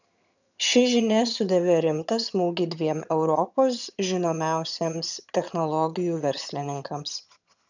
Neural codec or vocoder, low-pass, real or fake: vocoder, 22.05 kHz, 80 mel bands, HiFi-GAN; 7.2 kHz; fake